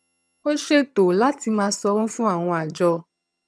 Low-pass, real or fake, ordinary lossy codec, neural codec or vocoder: none; fake; none; vocoder, 22.05 kHz, 80 mel bands, HiFi-GAN